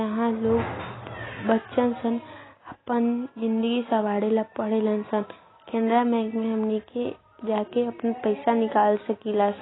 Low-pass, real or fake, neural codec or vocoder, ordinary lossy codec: 7.2 kHz; real; none; AAC, 16 kbps